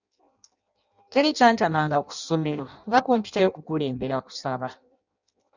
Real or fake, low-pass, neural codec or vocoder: fake; 7.2 kHz; codec, 16 kHz in and 24 kHz out, 0.6 kbps, FireRedTTS-2 codec